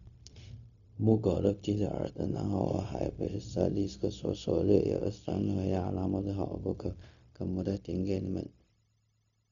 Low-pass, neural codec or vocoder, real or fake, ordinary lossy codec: 7.2 kHz; codec, 16 kHz, 0.4 kbps, LongCat-Audio-Codec; fake; none